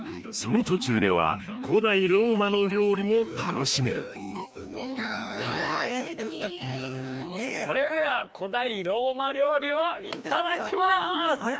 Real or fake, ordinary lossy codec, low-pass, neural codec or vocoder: fake; none; none; codec, 16 kHz, 1 kbps, FreqCodec, larger model